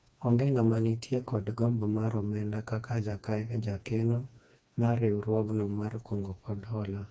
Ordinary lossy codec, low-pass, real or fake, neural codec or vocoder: none; none; fake; codec, 16 kHz, 2 kbps, FreqCodec, smaller model